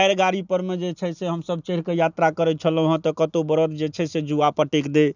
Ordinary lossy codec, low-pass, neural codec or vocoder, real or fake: none; 7.2 kHz; none; real